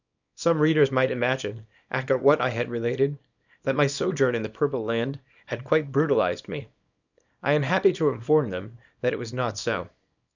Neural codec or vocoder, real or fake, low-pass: codec, 24 kHz, 0.9 kbps, WavTokenizer, small release; fake; 7.2 kHz